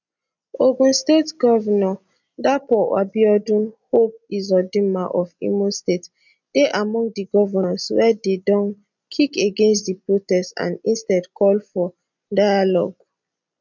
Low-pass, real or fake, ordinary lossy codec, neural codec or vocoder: 7.2 kHz; real; none; none